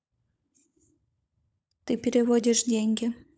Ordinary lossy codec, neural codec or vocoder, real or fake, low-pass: none; codec, 16 kHz, 16 kbps, FunCodec, trained on LibriTTS, 50 frames a second; fake; none